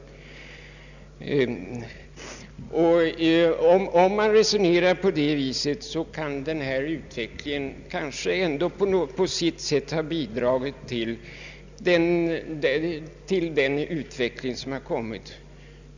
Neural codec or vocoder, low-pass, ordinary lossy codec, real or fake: none; 7.2 kHz; none; real